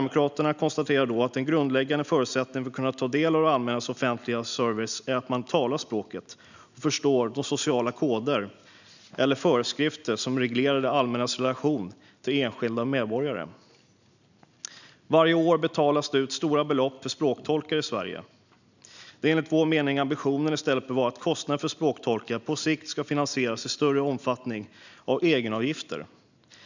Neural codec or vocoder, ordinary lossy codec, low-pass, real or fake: none; none; 7.2 kHz; real